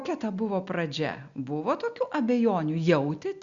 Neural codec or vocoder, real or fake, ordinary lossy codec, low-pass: none; real; Opus, 64 kbps; 7.2 kHz